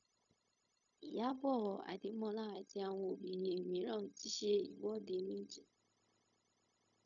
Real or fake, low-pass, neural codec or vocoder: fake; 7.2 kHz; codec, 16 kHz, 0.4 kbps, LongCat-Audio-Codec